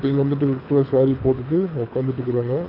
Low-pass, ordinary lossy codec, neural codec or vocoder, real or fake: 5.4 kHz; none; codec, 24 kHz, 6 kbps, HILCodec; fake